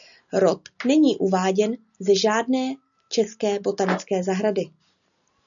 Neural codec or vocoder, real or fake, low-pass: none; real; 7.2 kHz